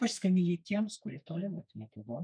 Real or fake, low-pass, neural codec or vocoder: fake; 9.9 kHz; codec, 32 kHz, 1.9 kbps, SNAC